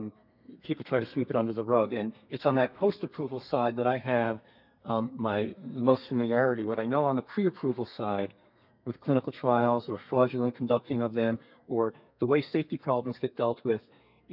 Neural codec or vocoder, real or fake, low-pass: codec, 32 kHz, 1.9 kbps, SNAC; fake; 5.4 kHz